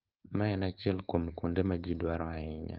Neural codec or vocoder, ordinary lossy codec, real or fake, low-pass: codec, 16 kHz, 4.8 kbps, FACodec; Opus, 32 kbps; fake; 5.4 kHz